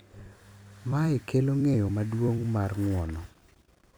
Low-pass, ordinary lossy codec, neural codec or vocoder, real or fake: none; none; vocoder, 44.1 kHz, 128 mel bands every 256 samples, BigVGAN v2; fake